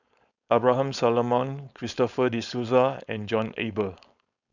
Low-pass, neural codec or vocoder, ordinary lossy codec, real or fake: 7.2 kHz; codec, 16 kHz, 4.8 kbps, FACodec; none; fake